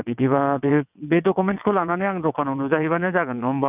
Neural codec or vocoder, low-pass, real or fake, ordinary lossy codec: vocoder, 22.05 kHz, 80 mel bands, WaveNeXt; 3.6 kHz; fake; none